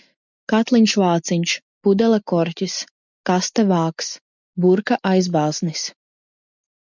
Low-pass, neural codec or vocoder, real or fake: 7.2 kHz; none; real